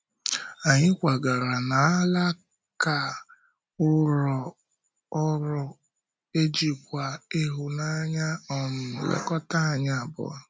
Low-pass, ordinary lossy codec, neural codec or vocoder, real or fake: none; none; none; real